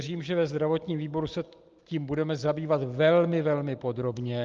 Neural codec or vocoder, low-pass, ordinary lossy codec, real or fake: none; 7.2 kHz; Opus, 32 kbps; real